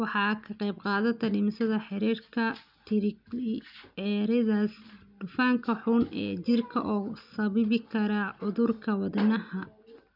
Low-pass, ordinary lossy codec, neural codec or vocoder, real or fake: 5.4 kHz; none; none; real